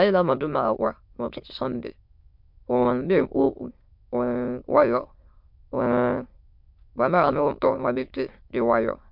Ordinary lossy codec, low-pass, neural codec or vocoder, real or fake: MP3, 48 kbps; 5.4 kHz; autoencoder, 22.05 kHz, a latent of 192 numbers a frame, VITS, trained on many speakers; fake